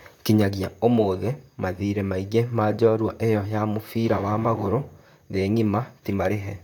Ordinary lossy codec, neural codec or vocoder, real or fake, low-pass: none; vocoder, 44.1 kHz, 128 mel bands, Pupu-Vocoder; fake; 19.8 kHz